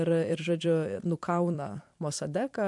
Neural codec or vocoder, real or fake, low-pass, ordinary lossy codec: vocoder, 44.1 kHz, 128 mel bands every 256 samples, BigVGAN v2; fake; 10.8 kHz; MP3, 64 kbps